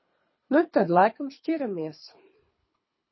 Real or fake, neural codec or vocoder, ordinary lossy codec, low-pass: fake; codec, 24 kHz, 3 kbps, HILCodec; MP3, 24 kbps; 7.2 kHz